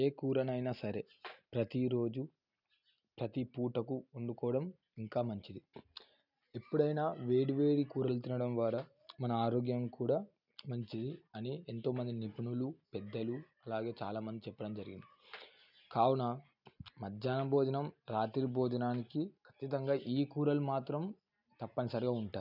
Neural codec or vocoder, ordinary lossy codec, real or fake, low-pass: none; none; real; 5.4 kHz